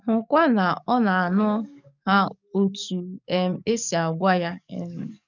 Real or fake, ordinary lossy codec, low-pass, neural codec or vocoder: fake; none; 7.2 kHz; codec, 44.1 kHz, 7.8 kbps, DAC